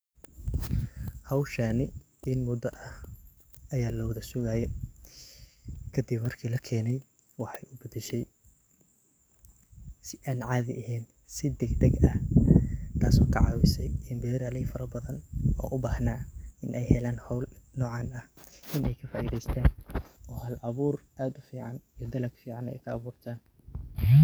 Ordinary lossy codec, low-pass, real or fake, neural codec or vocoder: none; none; fake; codec, 44.1 kHz, 7.8 kbps, DAC